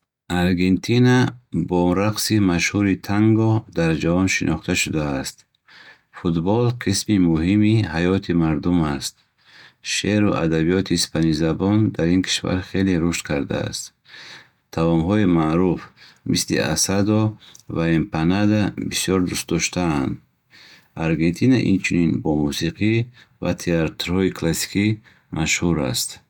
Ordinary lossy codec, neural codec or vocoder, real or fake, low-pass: none; none; real; 19.8 kHz